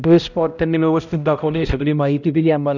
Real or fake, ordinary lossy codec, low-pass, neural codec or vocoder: fake; none; 7.2 kHz; codec, 16 kHz, 0.5 kbps, X-Codec, HuBERT features, trained on balanced general audio